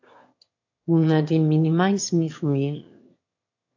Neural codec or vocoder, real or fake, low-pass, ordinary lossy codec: autoencoder, 22.05 kHz, a latent of 192 numbers a frame, VITS, trained on one speaker; fake; 7.2 kHz; AAC, 48 kbps